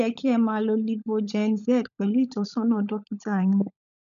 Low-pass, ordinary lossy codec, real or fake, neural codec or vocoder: 7.2 kHz; none; fake; codec, 16 kHz, 16 kbps, FunCodec, trained on LibriTTS, 50 frames a second